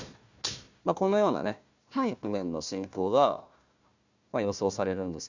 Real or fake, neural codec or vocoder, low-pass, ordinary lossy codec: fake; codec, 16 kHz, 1 kbps, FunCodec, trained on Chinese and English, 50 frames a second; 7.2 kHz; Opus, 64 kbps